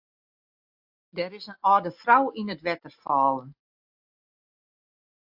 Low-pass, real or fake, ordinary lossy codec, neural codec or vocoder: 5.4 kHz; real; AAC, 48 kbps; none